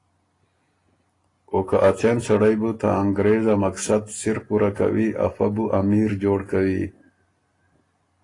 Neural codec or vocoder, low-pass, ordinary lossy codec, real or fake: none; 10.8 kHz; AAC, 32 kbps; real